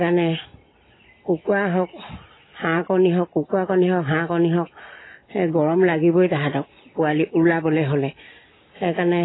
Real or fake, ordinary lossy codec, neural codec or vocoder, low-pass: real; AAC, 16 kbps; none; 7.2 kHz